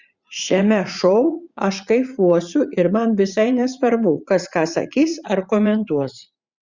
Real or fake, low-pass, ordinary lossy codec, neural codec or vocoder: fake; 7.2 kHz; Opus, 64 kbps; vocoder, 44.1 kHz, 80 mel bands, Vocos